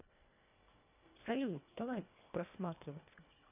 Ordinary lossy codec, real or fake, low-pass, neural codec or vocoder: none; fake; 3.6 kHz; codec, 24 kHz, 1.5 kbps, HILCodec